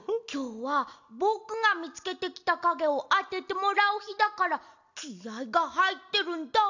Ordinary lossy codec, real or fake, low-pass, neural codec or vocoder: none; real; 7.2 kHz; none